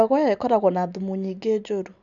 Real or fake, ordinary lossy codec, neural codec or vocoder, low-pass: real; none; none; 7.2 kHz